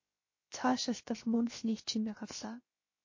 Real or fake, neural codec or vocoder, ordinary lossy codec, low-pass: fake; codec, 16 kHz, 0.7 kbps, FocalCodec; MP3, 32 kbps; 7.2 kHz